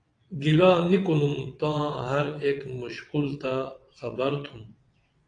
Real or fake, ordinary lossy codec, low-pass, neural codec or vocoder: fake; AAC, 48 kbps; 9.9 kHz; vocoder, 22.05 kHz, 80 mel bands, WaveNeXt